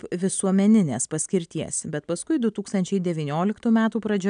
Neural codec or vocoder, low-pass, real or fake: none; 9.9 kHz; real